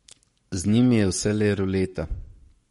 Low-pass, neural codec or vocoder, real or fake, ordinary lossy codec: 19.8 kHz; codec, 44.1 kHz, 7.8 kbps, Pupu-Codec; fake; MP3, 48 kbps